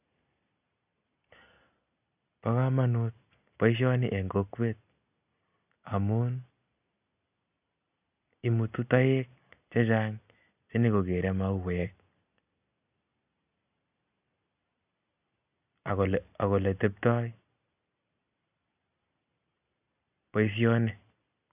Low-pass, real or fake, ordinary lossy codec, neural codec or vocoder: 3.6 kHz; real; AAC, 32 kbps; none